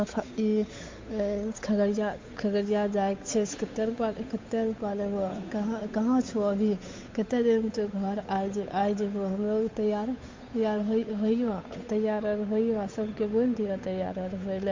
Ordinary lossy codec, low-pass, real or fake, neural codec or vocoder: MP3, 48 kbps; 7.2 kHz; fake; codec, 16 kHz, 8 kbps, FunCodec, trained on Chinese and English, 25 frames a second